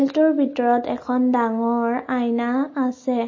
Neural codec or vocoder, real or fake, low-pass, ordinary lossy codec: none; real; 7.2 kHz; MP3, 32 kbps